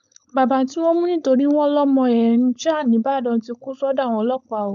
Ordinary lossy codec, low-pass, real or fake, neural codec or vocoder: none; 7.2 kHz; fake; codec, 16 kHz, 4.8 kbps, FACodec